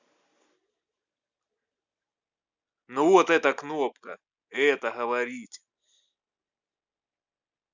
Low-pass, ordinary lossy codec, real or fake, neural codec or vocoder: 7.2 kHz; Opus, 64 kbps; real; none